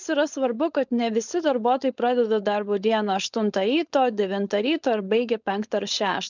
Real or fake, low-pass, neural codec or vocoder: fake; 7.2 kHz; codec, 16 kHz, 4.8 kbps, FACodec